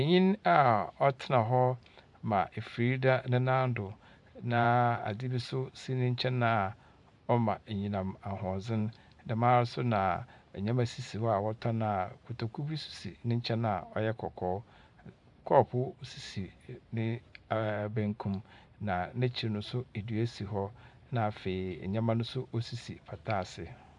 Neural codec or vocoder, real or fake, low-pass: vocoder, 44.1 kHz, 128 mel bands every 512 samples, BigVGAN v2; fake; 10.8 kHz